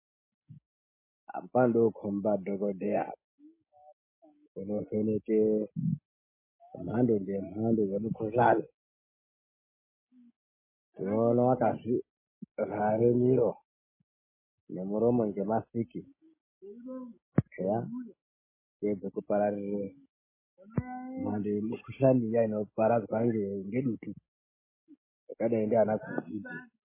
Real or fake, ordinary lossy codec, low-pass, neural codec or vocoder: real; MP3, 16 kbps; 3.6 kHz; none